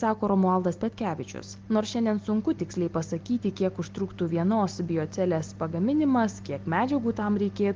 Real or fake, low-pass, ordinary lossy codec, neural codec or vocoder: real; 7.2 kHz; Opus, 32 kbps; none